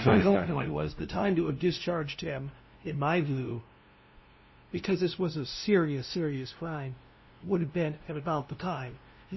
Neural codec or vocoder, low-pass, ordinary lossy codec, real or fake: codec, 16 kHz, 0.5 kbps, FunCodec, trained on LibriTTS, 25 frames a second; 7.2 kHz; MP3, 24 kbps; fake